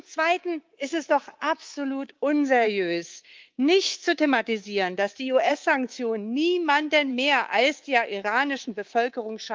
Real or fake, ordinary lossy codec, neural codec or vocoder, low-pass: fake; Opus, 32 kbps; codec, 24 kHz, 3.1 kbps, DualCodec; 7.2 kHz